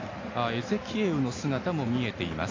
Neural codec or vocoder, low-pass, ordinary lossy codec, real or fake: none; 7.2 kHz; AAC, 32 kbps; real